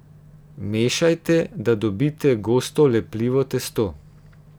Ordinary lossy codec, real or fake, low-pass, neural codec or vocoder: none; real; none; none